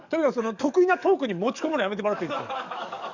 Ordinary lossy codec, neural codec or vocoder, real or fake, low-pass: none; codec, 44.1 kHz, 7.8 kbps, DAC; fake; 7.2 kHz